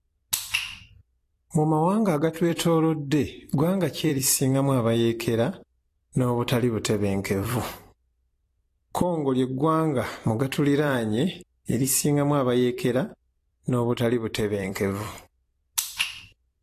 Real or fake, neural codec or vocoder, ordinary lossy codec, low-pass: fake; vocoder, 44.1 kHz, 128 mel bands every 256 samples, BigVGAN v2; AAC, 48 kbps; 14.4 kHz